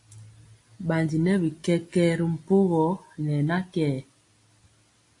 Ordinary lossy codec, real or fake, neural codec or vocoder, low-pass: AAC, 48 kbps; real; none; 10.8 kHz